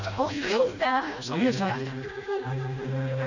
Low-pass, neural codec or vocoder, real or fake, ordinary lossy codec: 7.2 kHz; codec, 16 kHz, 1 kbps, FreqCodec, smaller model; fake; none